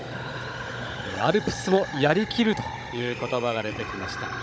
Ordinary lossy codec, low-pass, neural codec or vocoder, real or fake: none; none; codec, 16 kHz, 16 kbps, FunCodec, trained on Chinese and English, 50 frames a second; fake